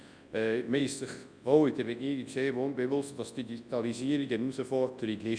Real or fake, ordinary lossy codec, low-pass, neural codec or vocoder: fake; Opus, 64 kbps; 9.9 kHz; codec, 24 kHz, 0.9 kbps, WavTokenizer, large speech release